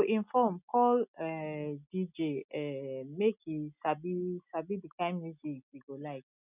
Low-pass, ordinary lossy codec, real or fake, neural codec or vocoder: 3.6 kHz; none; real; none